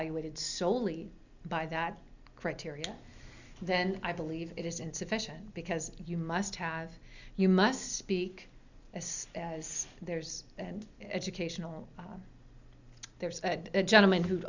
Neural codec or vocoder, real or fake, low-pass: none; real; 7.2 kHz